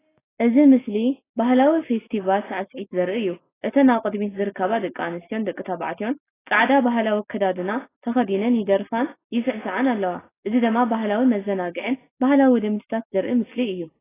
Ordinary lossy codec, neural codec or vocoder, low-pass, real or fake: AAC, 16 kbps; none; 3.6 kHz; real